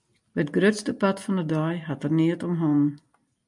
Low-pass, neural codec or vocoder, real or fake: 10.8 kHz; none; real